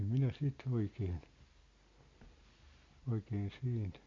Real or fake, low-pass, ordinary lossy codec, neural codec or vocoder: real; 7.2 kHz; MP3, 48 kbps; none